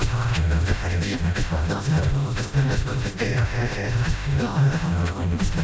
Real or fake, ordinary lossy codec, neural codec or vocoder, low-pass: fake; none; codec, 16 kHz, 0.5 kbps, FreqCodec, smaller model; none